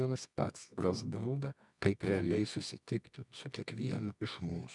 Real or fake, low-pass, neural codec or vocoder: fake; 10.8 kHz; codec, 24 kHz, 0.9 kbps, WavTokenizer, medium music audio release